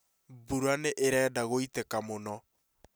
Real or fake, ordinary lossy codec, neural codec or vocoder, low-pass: real; none; none; none